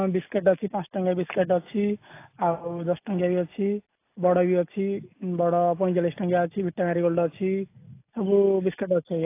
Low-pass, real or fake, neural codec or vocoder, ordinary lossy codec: 3.6 kHz; real; none; AAC, 24 kbps